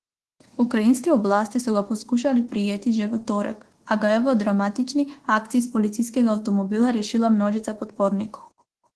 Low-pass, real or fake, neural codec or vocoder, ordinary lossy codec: 10.8 kHz; fake; codec, 24 kHz, 1.2 kbps, DualCodec; Opus, 16 kbps